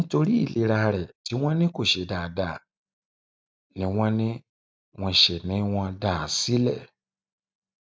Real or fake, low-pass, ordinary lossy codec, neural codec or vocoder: real; none; none; none